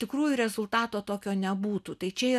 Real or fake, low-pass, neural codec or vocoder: real; 14.4 kHz; none